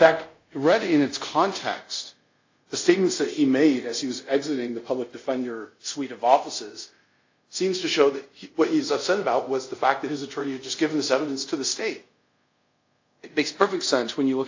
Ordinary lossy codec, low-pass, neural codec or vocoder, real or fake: AAC, 48 kbps; 7.2 kHz; codec, 24 kHz, 0.5 kbps, DualCodec; fake